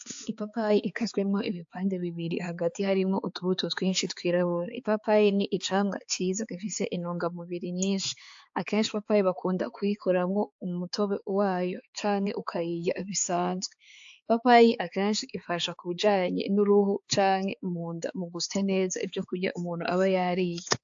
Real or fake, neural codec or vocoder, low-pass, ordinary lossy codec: fake; codec, 16 kHz, 4 kbps, X-Codec, HuBERT features, trained on balanced general audio; 7.2 kHz; AAC, 64 kbps